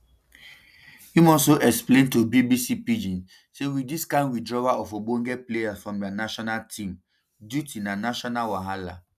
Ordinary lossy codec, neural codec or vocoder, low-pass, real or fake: none; none; 14.4 kHz; real